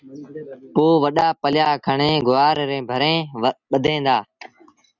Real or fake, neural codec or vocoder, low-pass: real; none; 7.2 kHz